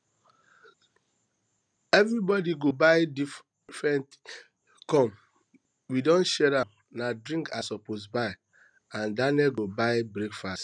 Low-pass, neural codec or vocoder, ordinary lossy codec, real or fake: 9.9 kHz; none; none; real